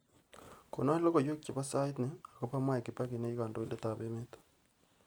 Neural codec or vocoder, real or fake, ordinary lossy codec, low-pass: none; real; none; none